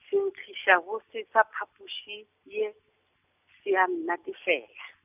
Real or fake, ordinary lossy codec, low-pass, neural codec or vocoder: real; none; 3.6 kHz; none